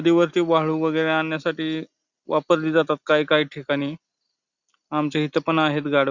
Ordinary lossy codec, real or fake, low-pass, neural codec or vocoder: Opus, 64 kbps; real; 7.2 kHz; none